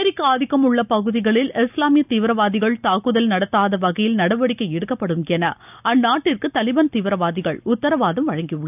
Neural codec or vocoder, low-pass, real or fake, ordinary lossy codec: none; 3.6 kHz; real; none